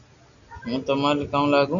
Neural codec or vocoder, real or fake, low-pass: none; real; 7.2 kHz